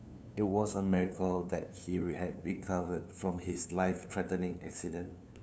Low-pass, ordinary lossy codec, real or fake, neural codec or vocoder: none; none; fake; codec, 16 kHz, 2 kbps, FunCodec, trained on LibriTTS, 25 frames a second